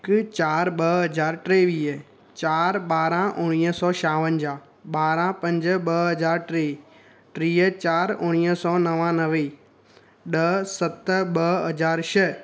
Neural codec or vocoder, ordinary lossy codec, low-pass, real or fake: none; none; none; real